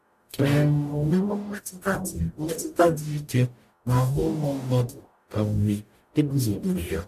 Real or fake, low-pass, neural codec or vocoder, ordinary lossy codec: fake; 14.4 kHz; codec, 44.1 kHz, 0.9 kbps, DAC; AAC, 96 kbps